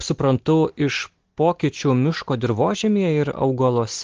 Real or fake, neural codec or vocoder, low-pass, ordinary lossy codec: real; none; 7.2 kHz; Opus, 16 kbps